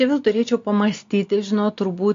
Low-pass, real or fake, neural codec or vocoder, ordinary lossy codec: 7.2 kHz; real; none; AAC, 48 kbps